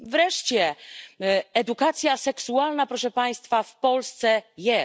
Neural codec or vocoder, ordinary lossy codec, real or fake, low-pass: none; none; real; none